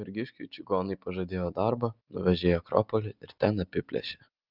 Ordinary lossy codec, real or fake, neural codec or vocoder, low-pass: Opus, 24 kbps; real; none; 5.4 kHz